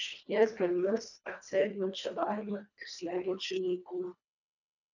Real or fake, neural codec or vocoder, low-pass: fake; codec, 24 kHz, 1.5 kbps, HILCodec; 7.2 kHz